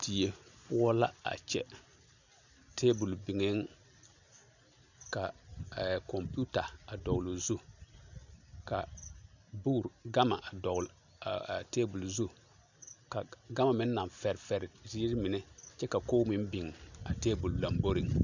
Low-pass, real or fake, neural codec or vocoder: 7.2 kHz; real; none